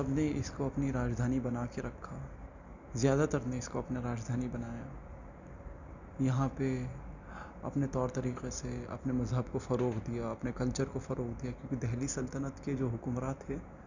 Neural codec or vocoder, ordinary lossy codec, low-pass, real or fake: none; none; 7.2 kHz; real